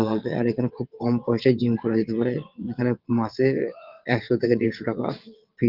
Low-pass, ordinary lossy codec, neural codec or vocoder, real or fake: 5.4 kHz; Opus, 32 kbps; vocoder, 22.05 kHz, 80 mel bands, WaveNeXt; fake